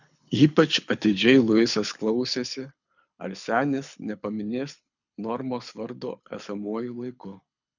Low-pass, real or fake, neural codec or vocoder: 7.2 kHz; fake; codec, 24 kHz, 6 kbps, HILCodec